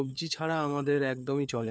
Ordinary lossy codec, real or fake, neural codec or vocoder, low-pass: none; fake; codec, 16 kHz, 4 kbps, FunCodec, trained on LibriTTS, 50 frames a second; none